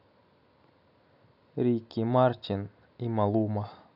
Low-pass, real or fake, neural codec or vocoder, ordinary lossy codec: 5.4 kHz; real; none; none